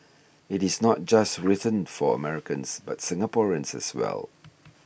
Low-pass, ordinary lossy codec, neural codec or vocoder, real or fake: none; none; none; real